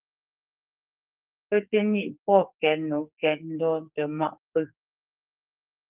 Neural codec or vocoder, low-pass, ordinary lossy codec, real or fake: codec, 44.1 kHz, 2.6 kbps, SNAC; 3.6 kHz; Opus, 24 kbps; fake